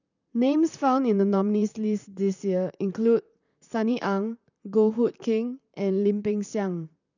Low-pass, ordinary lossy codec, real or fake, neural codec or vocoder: 7.2 kHz; none; fake; vocoder, 44.1 kHz, 128 mel bands, Pupu-Vocoder